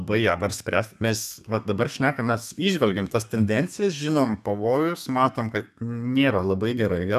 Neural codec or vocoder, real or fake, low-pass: codec, 32 kHz, 1.9 kbps, SNAC; fake; 14.4 kHz